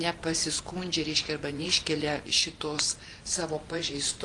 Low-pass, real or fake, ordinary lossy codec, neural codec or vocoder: 10.8 kHz; fake; Opus, 32 kbps; vocoder, 44.1 kHz, 128 mel bands every 512 samples, BigVGAN v2